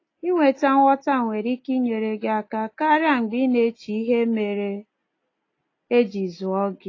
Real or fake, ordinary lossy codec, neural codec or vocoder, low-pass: real; AAC, 32 kbps; none; 7.2 kHz